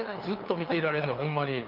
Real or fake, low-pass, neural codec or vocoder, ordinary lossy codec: fake; 5.4 kHz; codec, 16 kHz, 2 kbps, FunCodec, trained on LibriTTS, 25 frames a second; Opus, 24 kbps